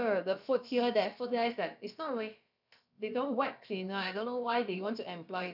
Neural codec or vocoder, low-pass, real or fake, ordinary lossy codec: codec, 16 kHz, about 1 kbps, DyCAST, with the encoder's durations; 5.4 kHz; fake; none